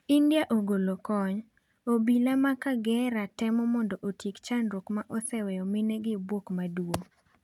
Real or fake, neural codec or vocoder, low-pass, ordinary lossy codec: real; none; 19.8 kHz; none